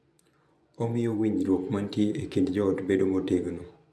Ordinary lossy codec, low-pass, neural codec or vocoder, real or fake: none; none; vocoder, 24 kHz, 100 mel bands, Vocos; fake